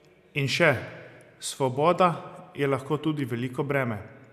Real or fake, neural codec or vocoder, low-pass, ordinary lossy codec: real; none; 14.4 kHz; none